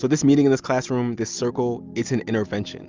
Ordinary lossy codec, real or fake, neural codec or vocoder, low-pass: Opus, 32 kbps; real; none; 7.2 kHz